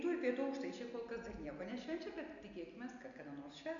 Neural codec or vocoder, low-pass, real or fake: none; 7.2 kHz; real